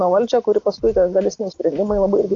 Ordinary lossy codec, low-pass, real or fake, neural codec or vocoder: AAC, 32 kbps; 7.2 kHz; real; none